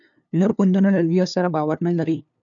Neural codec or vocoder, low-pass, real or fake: codec, 16 kHz, 2 kbps, FunCodec, trained on LibriTTS, 25 frames a second; 7.2 kHz; fake